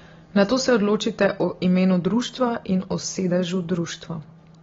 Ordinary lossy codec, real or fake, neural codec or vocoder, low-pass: AAC, 24 kbps; real; none; 7.2 kHz